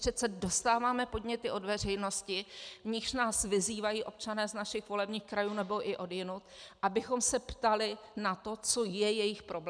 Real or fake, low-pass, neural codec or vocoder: real; 9.9 kHz; none